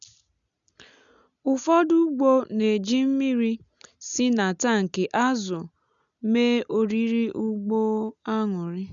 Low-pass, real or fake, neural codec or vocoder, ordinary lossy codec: 7.2 kHz; real; none; none